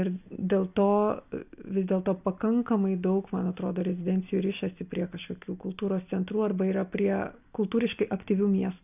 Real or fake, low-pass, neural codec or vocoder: real; 3.6 kHz; none